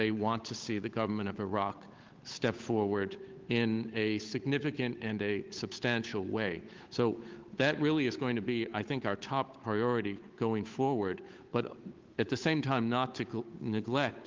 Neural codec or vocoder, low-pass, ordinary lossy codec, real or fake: codec, 16 kHz, 8 kbps, FunCodec, trained on Chinese and English, 25 frames a second; 7.2 kHz; Opus, 32 kbps; fake